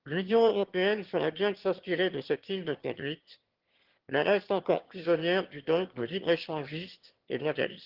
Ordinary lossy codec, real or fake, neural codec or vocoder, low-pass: Opus, 16 kbps; fake; autoencoder, 22.05 kHz, a latent of 192 numbers a frame, VITS, trained on one speaker; 5.4 kHz